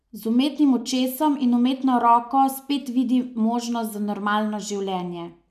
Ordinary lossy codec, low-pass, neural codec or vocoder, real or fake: none; 14.4 kHz; none; real